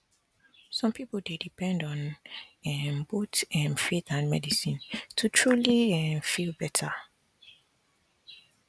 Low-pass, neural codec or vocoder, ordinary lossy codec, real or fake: none; none; none; real